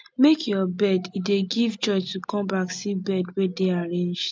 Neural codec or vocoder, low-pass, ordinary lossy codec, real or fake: none; none; none; real